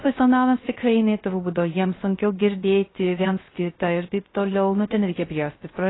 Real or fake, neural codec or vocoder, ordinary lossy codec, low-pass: fake; codec, 16 kHz, 0.3 kbps, FocalCodec; AAC, 16 kbps; 7.2 kHz